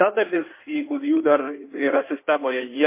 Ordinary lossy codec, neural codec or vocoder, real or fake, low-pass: MP3, 24 kbps; codec, 16 kHz in and 24 kHz out, 1.1 kbps, FireRedTTS-2 codec; fake; 3.6 kHz